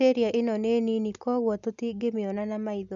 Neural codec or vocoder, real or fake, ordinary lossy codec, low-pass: none; real; none; 7.2 kHz